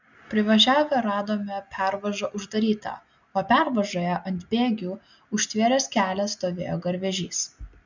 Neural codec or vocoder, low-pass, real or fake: none; 7.2 kHz; real